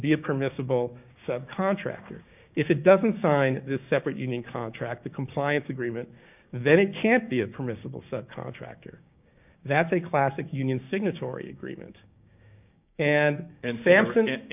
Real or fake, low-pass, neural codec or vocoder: fake; 3.6 kHz; codec, 16 kHz, 6 kbps, DAC